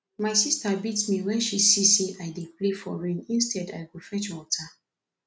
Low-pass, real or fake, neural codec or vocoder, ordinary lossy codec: none; real; none; none